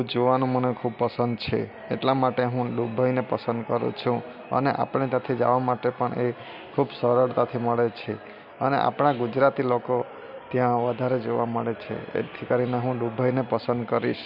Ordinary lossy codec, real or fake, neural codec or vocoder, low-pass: none; real; none; 5.4 kHz